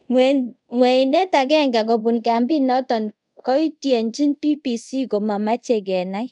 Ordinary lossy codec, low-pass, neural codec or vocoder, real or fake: none; 10.8 kHz; codec, 24 kHz, 0.5 kbps, DualCodec; fake